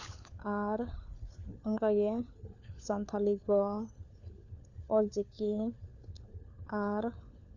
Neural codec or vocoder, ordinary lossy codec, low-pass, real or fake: codec, 16 kHz, 4 kbps, FunCodec, trained on LibriTTS, 50 frames a second; none; 7.2 kHz; fake